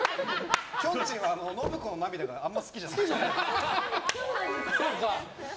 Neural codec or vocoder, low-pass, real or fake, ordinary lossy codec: none; none; real; none